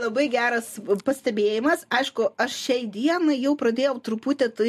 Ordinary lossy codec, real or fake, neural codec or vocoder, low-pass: MP3, 64 kbps; real; none; 14.4 kHz